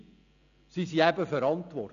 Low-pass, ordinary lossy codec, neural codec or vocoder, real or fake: 7.2 kHz; none; none; real